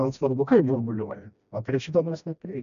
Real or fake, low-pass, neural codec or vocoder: fake; 7.2 kHz; codec, 16 kHz, 1 kbps, FreqCodec, smaller model